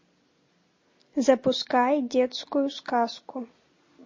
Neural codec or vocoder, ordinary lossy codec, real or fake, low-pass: none; MP3, 32 kbps; real; 7.2 kHz